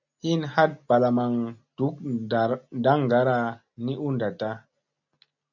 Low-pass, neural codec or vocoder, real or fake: 7.2 kHz; none; real